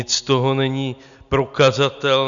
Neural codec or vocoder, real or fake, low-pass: none; real; 7.2 kHz